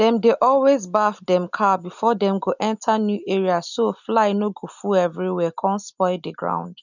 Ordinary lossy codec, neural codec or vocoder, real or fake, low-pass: none; none; real; 7.2 kHz